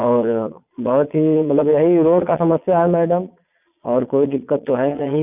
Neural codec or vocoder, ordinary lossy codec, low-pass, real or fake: vocoder, 22.05 kHz, 80 mel bands, Vocos; none; 3.6 kHz; fake